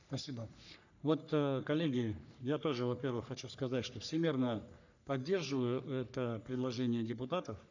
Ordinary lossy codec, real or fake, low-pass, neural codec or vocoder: none; fake; 7.2 kHz; codec, 44.1 kHz, 3.4 kbps, Pupu-Codec